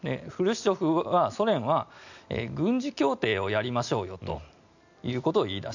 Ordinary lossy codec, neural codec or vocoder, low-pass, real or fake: none; none; 7.2 kHz; real